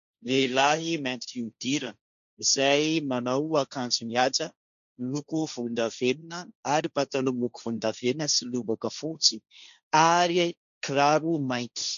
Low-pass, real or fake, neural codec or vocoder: 7.2 kHz; fake; codec, 16 kHz, 1.1 kbps, Voila-Tokenizer